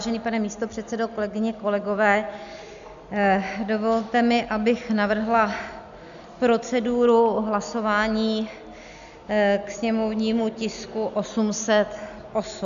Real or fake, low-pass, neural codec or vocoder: real; 7.2 kHz; none